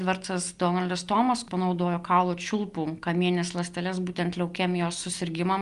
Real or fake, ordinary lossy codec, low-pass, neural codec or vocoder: real; Opus, 32 kbps; 10.8 kHz; none